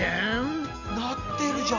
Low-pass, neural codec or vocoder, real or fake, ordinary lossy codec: 7.2 kHz; none; real; none